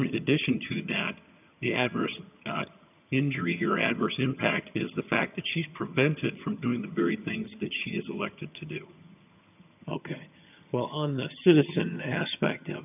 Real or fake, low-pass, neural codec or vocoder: fake; 3.6 kHz; vocoder, 22.05 kHz, 80 mel bands, HiFi-GAN